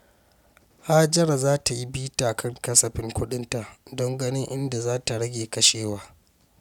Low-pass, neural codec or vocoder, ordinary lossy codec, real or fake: 19.8 kHz; none; none; real